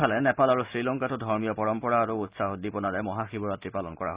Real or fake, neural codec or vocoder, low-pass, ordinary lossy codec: real; none; 3.6 kHz; Opus, 64 kbps